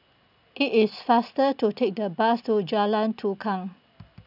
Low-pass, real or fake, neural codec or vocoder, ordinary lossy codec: 5.4 kHz; real; none; none